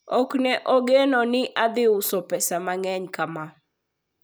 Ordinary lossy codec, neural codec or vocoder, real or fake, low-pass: none; none; real; none